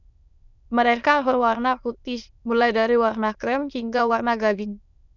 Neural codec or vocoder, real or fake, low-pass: autoencoder, 22.05 kHz, a latent of 192 numbers a frame, VITS, trained on many speakers; fake; 7.2 kHz